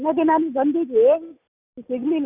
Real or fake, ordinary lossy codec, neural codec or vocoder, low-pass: real; Opus, 64 kbps; none; 3.6 kHz